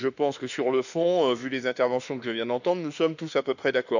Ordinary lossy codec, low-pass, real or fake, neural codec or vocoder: none; 7.2 kHz; fake; autoencoder, 48 kHz, 32 numbers a frame, DAC-VAE, trained on Japanese speech